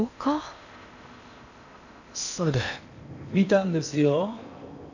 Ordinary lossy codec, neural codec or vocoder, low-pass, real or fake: none; codec, 16 kHz in and 24 kHz out, 0.8 kbps, FocalCodec, streaming, 65536 codes; 7.2 kHz; fake